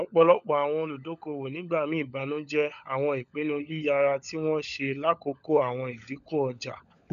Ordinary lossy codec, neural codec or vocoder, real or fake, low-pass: none; codec, 16 kHz, 16 kbps, FunCodec, trained on LibriTTS, 50 frames a second; fake; 7.2 kHz